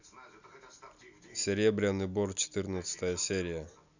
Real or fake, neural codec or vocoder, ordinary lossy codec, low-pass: real; none; none; 7.2 kHz